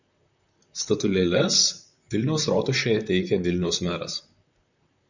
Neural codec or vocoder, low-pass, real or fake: vocoder, 44.1 kHz, 128 mel bands, Pupu-Vocoder; 7.2 kHz; fake